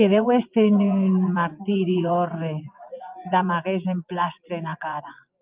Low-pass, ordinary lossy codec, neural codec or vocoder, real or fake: 3.6 kHz; Opus, 24 kbps; vocoder, 24 kHz, 100 mel bands, Vocos; fake